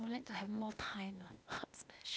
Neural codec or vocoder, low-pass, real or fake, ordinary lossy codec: codec, 16 kHz, 0.8 kbps, ZipCodec; none; fake; none